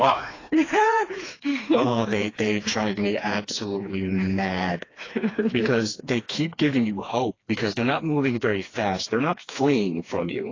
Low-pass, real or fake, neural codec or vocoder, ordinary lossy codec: 7.2 kHz; fake; codec, 16 kHz, 2 kbps, FreqCodec, smaller model; AAC, 32 kbps